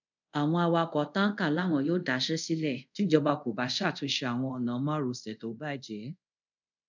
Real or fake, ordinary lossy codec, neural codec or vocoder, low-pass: fake; none; codec, 24 kHz, 0.5 kbps, DualCodec; 7.2 kHz